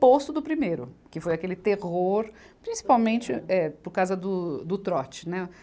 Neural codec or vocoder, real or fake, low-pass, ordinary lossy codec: none; real; none; none